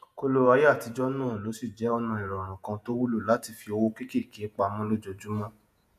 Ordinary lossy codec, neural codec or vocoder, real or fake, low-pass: none; vocoder, 48 kHz, 128 mel bands, Vocos; fake; 14.4 kHz